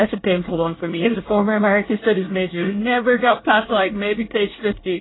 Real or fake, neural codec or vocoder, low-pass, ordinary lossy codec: fake; codec, 24 kHz, 1 kbps, SNAC; 7.2 kHz; AAC, 16 kbps